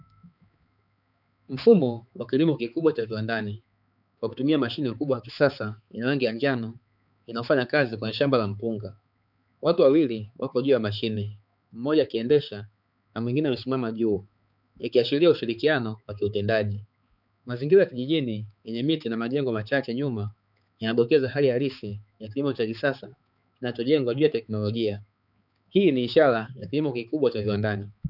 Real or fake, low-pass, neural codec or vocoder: fake; 5.4 kHz; codec, 16 kHz, 4 kbps, X-Codec, HuBERT features, trained on balanced general audio